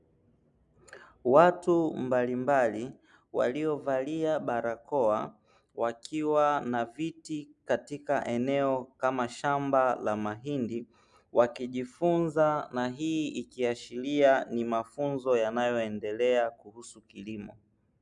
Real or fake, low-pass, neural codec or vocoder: real; 10.8 kHz; none